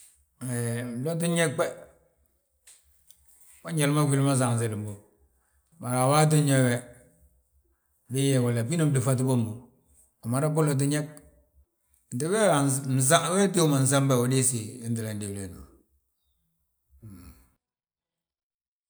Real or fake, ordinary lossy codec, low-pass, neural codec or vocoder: real; none; none; none